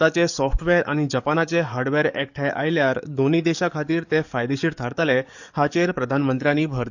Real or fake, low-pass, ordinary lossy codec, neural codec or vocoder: fake; 7.2 kHz; none; codec, 44.1 kHz, 7.8 kbps, DAC